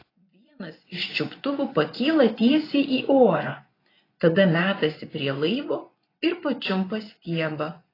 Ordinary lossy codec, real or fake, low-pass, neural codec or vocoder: AAC, 24 kbps; fake; 5.4 kHz; vocoder, 24 kHz, 100 mel bands, Vocos